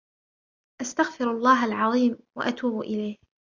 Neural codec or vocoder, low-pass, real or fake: none; 7.2 kHz; real